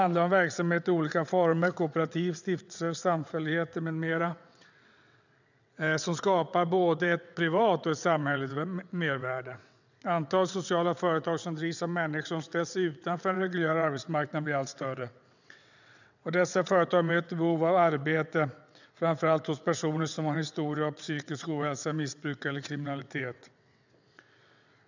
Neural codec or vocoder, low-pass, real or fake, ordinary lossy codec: vocoder, 22.05 kHz, 80 mel bands, WaveNeXt; 7.2 kHz; fake; none